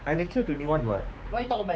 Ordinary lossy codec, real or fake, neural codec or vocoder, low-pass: none; fake; codec, 16 kHz, 4 kbps, X-Codec, HuBERT features, trained on general audio; none